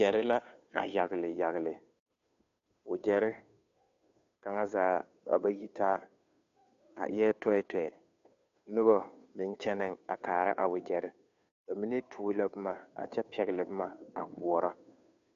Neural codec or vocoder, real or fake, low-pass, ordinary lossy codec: codec, 16 kHz, 2 kbps, FunCodec, trained on Chinese and English, 25 frames a second; fake; 7.2 kHz; AAC, 64 kbps